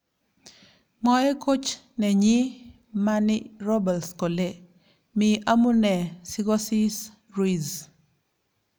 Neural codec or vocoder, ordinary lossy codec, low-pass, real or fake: none; none; none; real